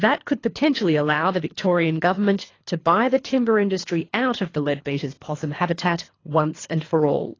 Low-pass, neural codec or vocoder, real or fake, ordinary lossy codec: 7.2 kHz; codec, 24 kHz, 3 kbps, HILCodec; fake; AAC, 32 kbps